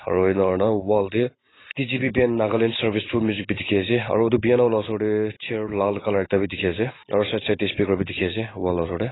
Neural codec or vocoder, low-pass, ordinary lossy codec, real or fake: none; 7.2 kHz; AAC, 16 kbps; real